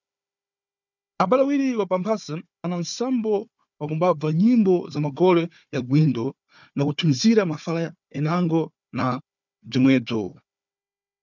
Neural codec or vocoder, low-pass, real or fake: codec, 16 kHz, 4 kbps, FunCodec, trained on Chinese and English, 50 frames a second; 7.2 kHz; fake